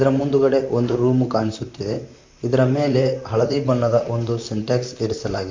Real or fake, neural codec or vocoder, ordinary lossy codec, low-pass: fake; vocoder, 44.1 kHz, 128 mel bands, Pupu-Vocoder; MP3, 48 kbps; 7.2 kHz